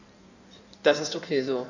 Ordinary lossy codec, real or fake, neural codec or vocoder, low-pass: none; fake; codec, 16 kHz in and 24 kHz out, 1.1 kbps, FireRedTTS-2 codec; 7.2 kHz